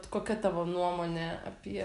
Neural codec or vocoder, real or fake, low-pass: none; real; 10.8 kHz